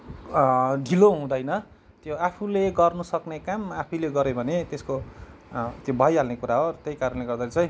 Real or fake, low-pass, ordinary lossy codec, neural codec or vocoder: real; none; none; none